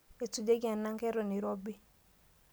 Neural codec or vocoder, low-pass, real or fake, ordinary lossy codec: none; none; real; none